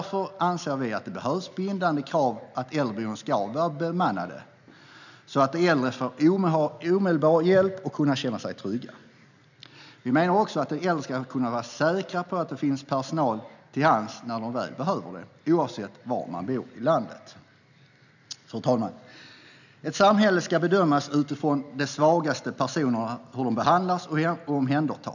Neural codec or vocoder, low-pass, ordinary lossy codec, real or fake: none; 7.2 kHz; none; real